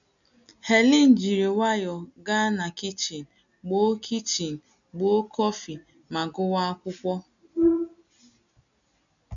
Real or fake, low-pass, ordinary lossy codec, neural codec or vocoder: real; 7.2 kHz; none; none